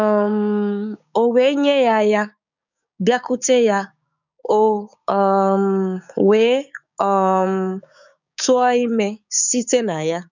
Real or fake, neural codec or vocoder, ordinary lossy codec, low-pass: fake; codec, 16 kHz, 6 kbps, DAC; none; 7.2 kHz